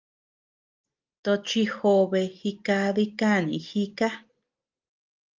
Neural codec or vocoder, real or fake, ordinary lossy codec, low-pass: none; real; Opus, 24 kbps; 7.2 kHz